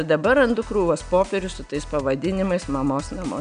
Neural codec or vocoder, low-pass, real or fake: none; 9.9 kHz; real